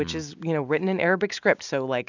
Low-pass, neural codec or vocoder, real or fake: 7.2 kHz; none; real